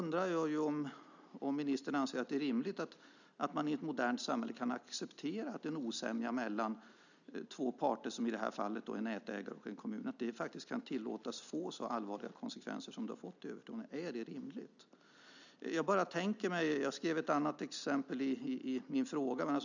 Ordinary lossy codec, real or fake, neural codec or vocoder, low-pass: none; real; none; 7.2 kHz